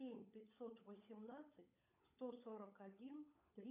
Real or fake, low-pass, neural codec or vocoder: fake; 3.6 kHz; codec, 16 kHz, 16 kbps, FunCodec, trained on LibriTTS, 50 frames a second